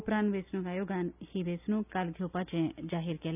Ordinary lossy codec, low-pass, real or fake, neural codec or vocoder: none; 3.6 kHz; real; none